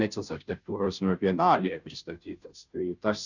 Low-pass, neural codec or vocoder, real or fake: 7.2 kHz; codec, 16 kHz, 0.5 kbps, FunCodec, trained on Chinese and English, 25 frames a second; fake